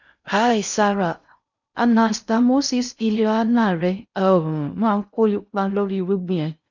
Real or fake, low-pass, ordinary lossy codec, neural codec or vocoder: fake; 7.2 kHz; none; codec, 16 kHz in and 24 kHz out, 0.6 kbps, FocalCodec, streaming, 4096 codes